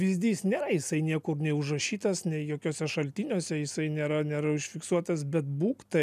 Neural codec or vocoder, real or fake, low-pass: none; real; 14.4 kHz